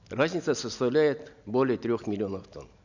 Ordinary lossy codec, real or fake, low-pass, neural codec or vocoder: none; real; 7.2 kHz; none